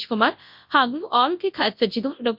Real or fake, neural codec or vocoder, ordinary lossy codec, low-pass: fake; codec, 24 kHz, 0.9 kbps, WavTokenizer, large speech release; MP3, 48 kbps; 5.4 kHz